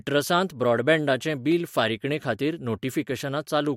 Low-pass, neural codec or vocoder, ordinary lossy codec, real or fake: 19.8 kHz; none; MP3, 64 kbps; real